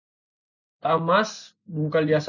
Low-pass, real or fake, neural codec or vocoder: 7.2 kHz; real; none